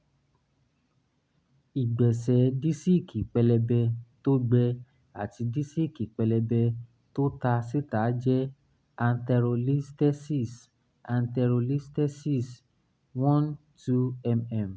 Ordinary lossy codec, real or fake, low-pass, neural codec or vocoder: none; real; none; none